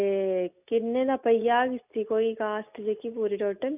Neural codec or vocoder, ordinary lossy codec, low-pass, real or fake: none; none; 3.6 kHz; real